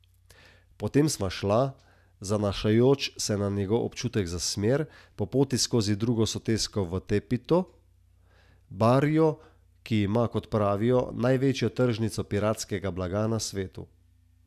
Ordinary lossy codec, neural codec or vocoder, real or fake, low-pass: none; none; real; 14.4 kHz